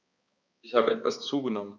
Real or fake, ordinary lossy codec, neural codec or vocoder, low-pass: fake; none; codec, 16 kHz, 2 kbps, X-Codec, HuBERT features, trained on balanced general audio; none